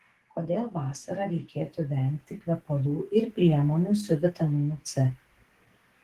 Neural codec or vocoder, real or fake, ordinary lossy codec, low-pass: codec, 44.1 kHz, 2.6 kbps, SNAC; fake; Opus, 16 kbps; 14.4 kHz